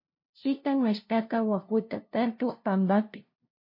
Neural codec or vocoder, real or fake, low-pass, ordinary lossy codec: codec, 16 kHz, 0.5 kbps, FunCodec, trained on LibriTTS, 25 frames a second; fake; 5.4 kHz; MP3, 32 kbps